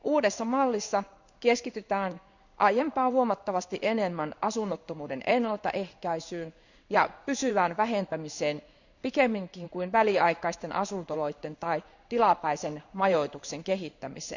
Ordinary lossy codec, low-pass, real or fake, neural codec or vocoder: none; 7.2 kHz; fake; codec, 16 kHz in and 24 kHz out, 1 kbps, XY-Tokenizer